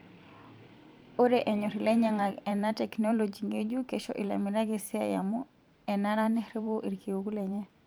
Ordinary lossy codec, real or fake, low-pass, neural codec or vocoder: none; fake; none; vocoder, 44.1 kHz, 128 mel bands every 512 samples, BigVGAN v2